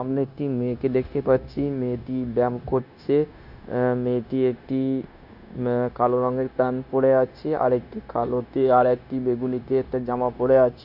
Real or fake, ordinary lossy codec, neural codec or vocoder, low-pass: fake; none; codec, 16 kHz, 0.9 kbps, LongCat-Audio-Codec; 5.4 kHz